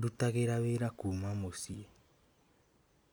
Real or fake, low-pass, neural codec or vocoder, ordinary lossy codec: real; none; none; none